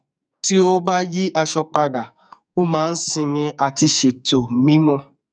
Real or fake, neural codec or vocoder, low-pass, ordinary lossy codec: fake; codec, 44.1 kHz, 2.6 kbps, SNAC; 9.9 kHz; none